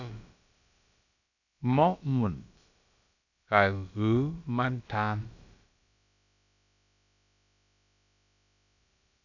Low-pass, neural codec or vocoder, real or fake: 7.2 kHz; codec, 16 kHz, about 1 kbps, DyCAST, with the encoder's durations; fake